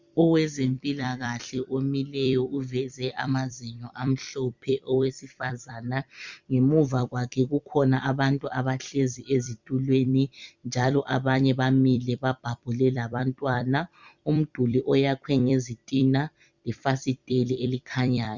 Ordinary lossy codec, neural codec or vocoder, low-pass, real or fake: Opus, 64 kbps; vocoder, 44.1 kHz, 128 mel bands every 256 samples, BigVGAN v2; 7.2 kHz; fake